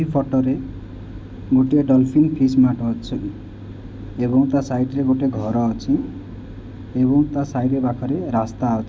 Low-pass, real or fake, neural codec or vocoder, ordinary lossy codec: none; real; none; none